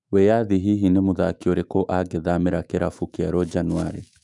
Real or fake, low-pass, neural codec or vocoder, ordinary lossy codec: fake; 10.8 kHz; autoencoder, 48 kHz, 128 numbers a frame, DAC-VAE, trained on Japanese speech; none